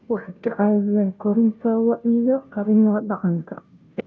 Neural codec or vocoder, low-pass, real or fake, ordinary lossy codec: codec, 16 kHz, 0.5 kbps, FunCodec, trained on Chinese and English, 25 frames a second; none; fake; none